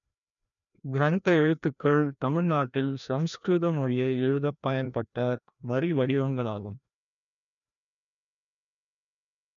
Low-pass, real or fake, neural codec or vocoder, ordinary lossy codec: 7.2 kHz; fake; codec, 16 kHz, 1 kbps, FreqCodec, larger model; none